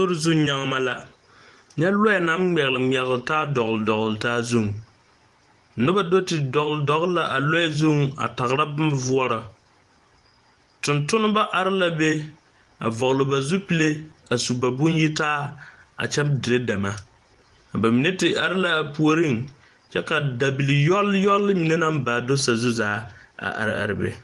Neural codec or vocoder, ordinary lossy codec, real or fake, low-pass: vocoder, 24 kHz, 100 mel bands, Vocos; Opus, 24 kbps; fake; 10.8 kHz